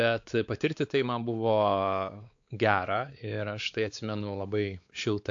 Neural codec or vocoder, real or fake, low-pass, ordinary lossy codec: codec, 16 kHz, 4 kbps, X-Codec, WavLM features, trained on Multilingual LibriSpeech; fake; 7.2 kHz; AAC, 64 kbps